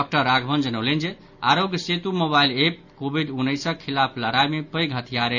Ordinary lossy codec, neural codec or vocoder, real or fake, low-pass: none; none; real; 7.2 kHz